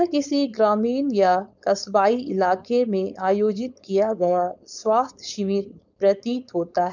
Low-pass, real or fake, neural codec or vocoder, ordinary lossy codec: 7.2 kHz; fake; codec, 16 kHz, 4.8 kbps, FACodec; none